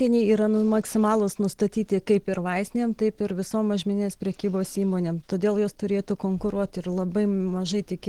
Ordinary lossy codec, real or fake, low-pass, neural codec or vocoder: Opus, 16 kbps; real; 19.8 kHz; none